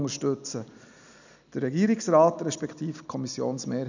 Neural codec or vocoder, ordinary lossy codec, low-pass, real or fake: none; none; 7.2 kHz; real